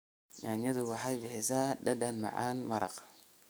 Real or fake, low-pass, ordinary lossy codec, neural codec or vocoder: fake; none; none; codec, 44.1 kHz, 7.8 kbps, DAC